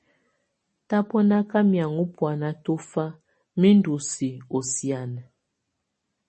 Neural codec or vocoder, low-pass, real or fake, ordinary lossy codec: none; 10.8 kHz; real; MP3, 32 kbps